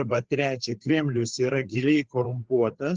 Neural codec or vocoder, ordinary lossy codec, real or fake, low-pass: codec, 16 kHz, 4 kbps, FreqCodec, larger model; Opus, 16 kbps; fake; 7.2 kHz